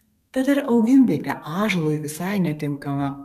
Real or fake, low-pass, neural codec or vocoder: fake; 14.4 kHz; codec, 32 kHz, 1.9 kbps, SNAC